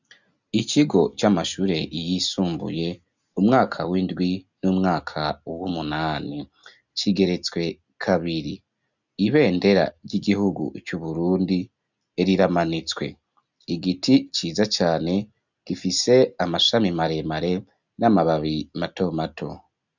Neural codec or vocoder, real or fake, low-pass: none; real; 7.2 kHz